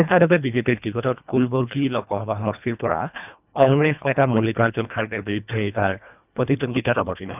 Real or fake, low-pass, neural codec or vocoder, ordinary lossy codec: fake; 3.6 kHz; codec, 24 kHz, 1.5 kbps, HILCodec; none